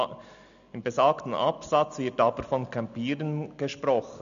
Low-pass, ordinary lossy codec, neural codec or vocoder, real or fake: 7.2 kHz; none; none; real